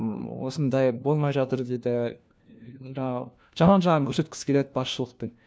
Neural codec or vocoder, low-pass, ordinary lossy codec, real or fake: codec, 16 kHz, 1 kbps, FunCodec, trained on LibriTTS, 50 frames a second; none; none; fake